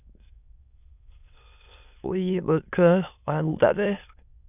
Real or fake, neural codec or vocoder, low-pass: fake; autoencoder, 22.05 kHz, a latent of 192 numbers a frame, VITS, trained on many speakers; 3.6 kHz